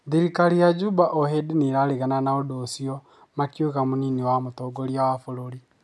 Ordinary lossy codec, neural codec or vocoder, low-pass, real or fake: none; none; none; real